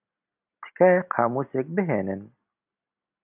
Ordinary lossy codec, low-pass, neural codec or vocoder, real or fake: AAC, 32 kbps; 3.6 kHz; none; real